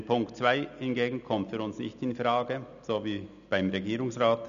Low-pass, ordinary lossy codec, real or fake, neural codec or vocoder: 7.2 kHz; none; real; none